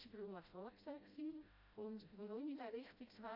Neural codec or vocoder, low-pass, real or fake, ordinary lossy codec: codec, 16 kHz, 0.5 kbps, FreqCodec, smaller model; 5.4 kHz; fake; AAC, 48 kbps